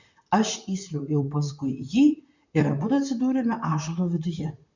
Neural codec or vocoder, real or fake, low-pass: vocoder, 44.1 kHz, 128 mel bands, Pupu-Vocoder; fake; 7.2 kHz